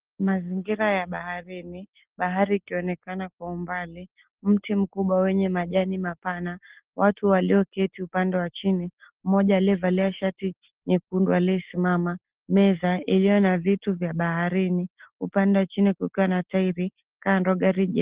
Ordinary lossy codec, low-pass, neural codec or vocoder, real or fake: Opus, 16 kbps; 3.6 kHz; none; real